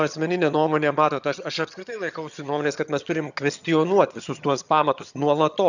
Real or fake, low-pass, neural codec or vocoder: fake; 7.2 kHz; vocoder, 22.05 kHz, 80 mel bands, HiFi-GAN